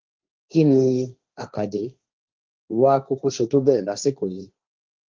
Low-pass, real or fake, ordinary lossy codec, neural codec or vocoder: 7.2 kHz; fake; Opus, 32 kbps; codec, 16 kHz, 1.1 kbps, Voila-Tokenizer